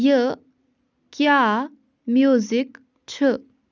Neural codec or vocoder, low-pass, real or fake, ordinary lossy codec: none; 7.2 kHz; real; none